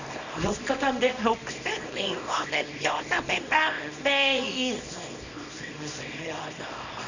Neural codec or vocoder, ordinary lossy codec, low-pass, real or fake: codec, 24 kHz, 0.9 kbps, WavTokenizer, small release; none; 7.2 kHz; fake